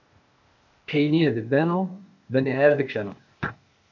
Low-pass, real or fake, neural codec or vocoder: 7.2 kHz; fake; codec, 16 kHz, 0.8 kbps, ZipCodec